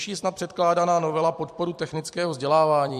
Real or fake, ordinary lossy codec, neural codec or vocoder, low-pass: fake; MP3, 64 kbps; vocoder, 44.1 kHz, 128 mel bands every 256 samples, BigVGAN v2; 14.4 kHz